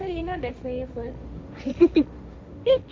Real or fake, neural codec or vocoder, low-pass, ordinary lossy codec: fake; codec, 16 kHz, 1.1 kbps, Voila-Tokenizer; none; none